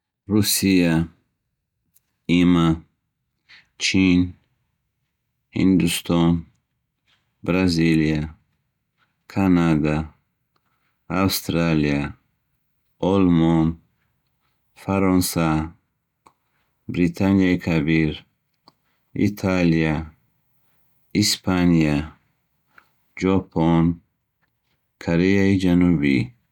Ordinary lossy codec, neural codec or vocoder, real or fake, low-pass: none; none; real; 19.8 kHz